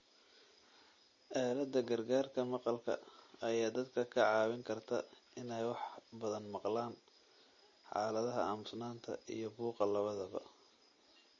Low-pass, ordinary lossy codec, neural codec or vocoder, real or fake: 7.2 kHz; MP3, 32 kbps; none; real